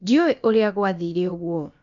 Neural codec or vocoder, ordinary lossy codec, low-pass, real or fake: codec, 16 kHz, about 1 kbps, DyCAST, with the encoder's durations; none; 7.2 kHz; fake